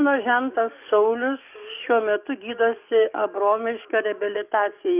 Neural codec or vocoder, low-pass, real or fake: codec, 44.1 kHz, 7.8 kbps, DAC; 3.6 kHz; fake